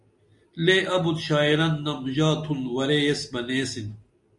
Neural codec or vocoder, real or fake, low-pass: none; real; 10.8 kHz